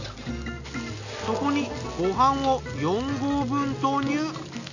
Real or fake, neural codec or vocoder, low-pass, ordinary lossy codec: real; none; 7.2 kHz; none